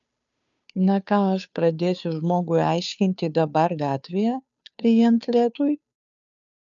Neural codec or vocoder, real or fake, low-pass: codec, 16 kHz, 2 kbps, FunCodec, trained on Chinese and English, 25 frames a second; fake; 7.2 kHz